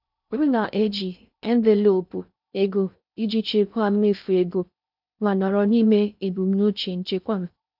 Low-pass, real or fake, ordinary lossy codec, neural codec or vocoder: 5.4 kHz; fake; none; codec, 16 kHz in and 24 kHz out, 0.6 kbps, FocalCodec, streaming, 2048 codes